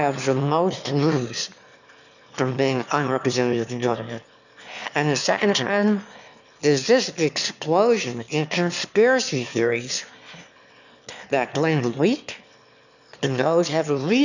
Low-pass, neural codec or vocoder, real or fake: 7.2 kHz; autoencoder, 22.05 kHz, a latent of 192 numbers a frame, VITS, trained on one speaker; fake